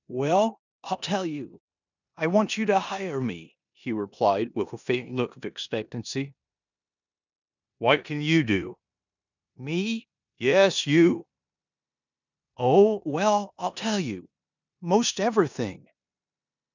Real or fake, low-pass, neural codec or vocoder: fake; 7.2 kHz; codec, 16 kHz in and 24 kHz out, 0.9 kbps, LongCat-Audio-Codec, four codebook decoder